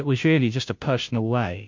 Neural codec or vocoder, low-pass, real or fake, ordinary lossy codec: codec, 16 kHz, 0.5 kbps, FunCodec, trained on Chinese and English, 25 frames a second; 7.2 kHz; fake; MP3, 48 kbps